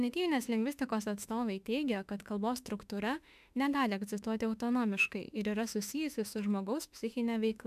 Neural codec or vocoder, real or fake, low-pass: autoencoder, 48 kHz, 32 numbers a frame, DAC-VAE, trained on Japanese speech; fake; 14.4 kHz